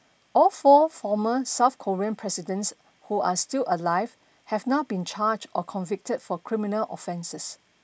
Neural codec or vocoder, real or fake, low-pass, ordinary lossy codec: none; real; none; none